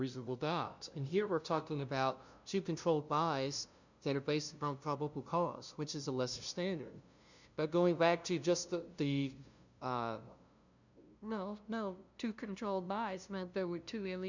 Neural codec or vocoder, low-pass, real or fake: codec, 16 kHz, 0.5 kbps, FunCodec, trained on LibriTTS, 25 frames a second; 7.2 kHz; fake